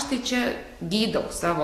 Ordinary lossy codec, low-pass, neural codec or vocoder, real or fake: AAC, 48 kbps; 14.4 kHz; none; real